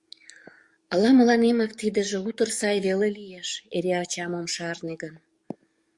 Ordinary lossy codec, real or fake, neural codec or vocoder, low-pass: Opus, 64 kbps; fake; codec, 44.1 kHz, 7.8 kbps, DAC; 10.8 kHz